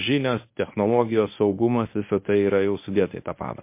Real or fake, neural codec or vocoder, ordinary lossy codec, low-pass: fake; codec, 24 kHz, 0.9 kbps, WavTokenizer, medium speech release version 1; MP3, 24 kbps; 3.6 kHz